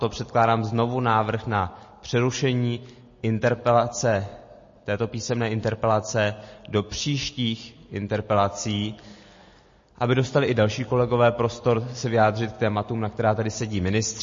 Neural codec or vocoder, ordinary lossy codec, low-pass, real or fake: none; MP3, 32 kbps; 7.2 kHz; real